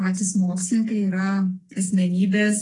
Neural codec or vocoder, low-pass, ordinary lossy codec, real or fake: codec, 44.1 kHz, 2.6 kbps, SNAC; 10.8 kHz; AAC, 32 kbps; fake